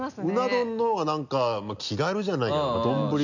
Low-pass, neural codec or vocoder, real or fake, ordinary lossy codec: 7.2 kHz; none; real; none